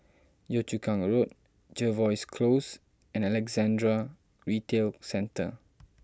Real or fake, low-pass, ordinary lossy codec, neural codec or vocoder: real; none; none; none